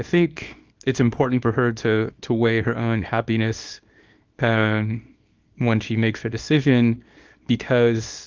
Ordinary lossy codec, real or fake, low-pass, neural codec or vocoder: Opus, 24 kbps; fake; 7.2 kHz; codec, 24 kHz, 0.9 kbps, WavTokenizer, small release